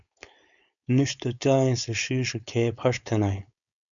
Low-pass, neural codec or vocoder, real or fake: 7.2 kHz; codec, 16 kHz, 4.8 kbps, FACodec; fake